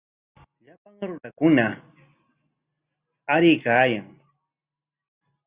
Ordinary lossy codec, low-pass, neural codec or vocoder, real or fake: Opus, 64 kbps; 3.6 kHz; none; real